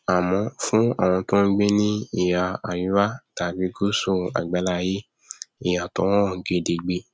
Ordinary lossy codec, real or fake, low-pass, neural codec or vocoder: none; real; none; none